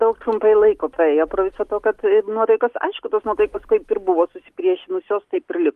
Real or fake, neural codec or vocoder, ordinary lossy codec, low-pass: real; none; Opus, 32 kbps; 14.4 kHz